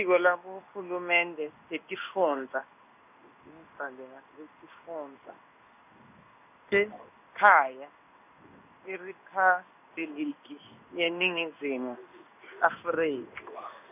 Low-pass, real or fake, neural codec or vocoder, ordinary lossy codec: 3.6 kHz; fake; codec, 16 kHz in and 24 kHz out, 1 kbps, XY-Tokenizer; none